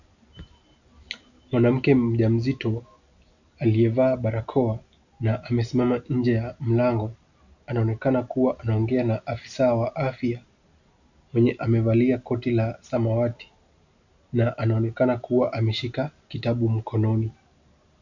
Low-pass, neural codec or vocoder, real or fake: 7.2 kHz; none; real